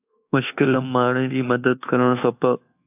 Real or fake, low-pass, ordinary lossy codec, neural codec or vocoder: fake; 3.6 kHz; AAC, 32 kbps; codec, 24 kHz, 1.2 kbps, DualCodec